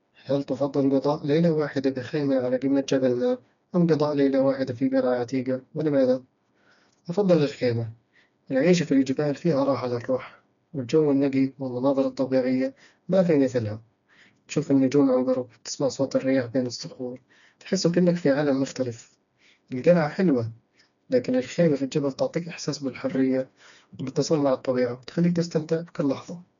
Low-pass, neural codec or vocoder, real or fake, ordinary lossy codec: 7.2 kHz; codec, 16 kHz, 2 kbps, FreqCodec, smaller model; fake; MP3, 96 kbps